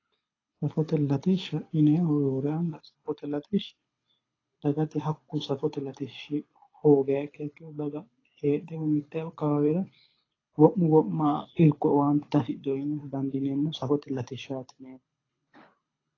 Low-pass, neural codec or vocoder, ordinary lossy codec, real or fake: 7.2 kHz; codec, 24 kHz, 6 kbps, HILCodec; AAC, 32 kbps; fake